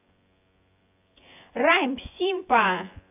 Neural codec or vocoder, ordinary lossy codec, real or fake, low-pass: vocoder, 24 kHz, 100 mel bands, Vocos; AAC, 24 kbps; fake; 3.6 kHz